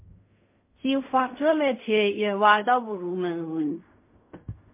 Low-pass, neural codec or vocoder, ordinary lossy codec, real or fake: 3.6 kHz; codec, 16 kHz in and 24 kHz out, 0.4 kbps, LongCat-Audio-Codec, fine tuned four codebook decoder; MP3, 24 kbps; fake